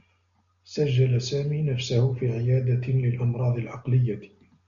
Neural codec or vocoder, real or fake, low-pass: none; real; 7.2 kHz